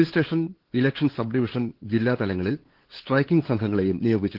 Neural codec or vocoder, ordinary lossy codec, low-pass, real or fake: codec, 16 kHz, 8 kbps, FunCodec, trained on LibriTTS, 25 frames a second; Opus, 16 kbps; 5.4 kHz; fake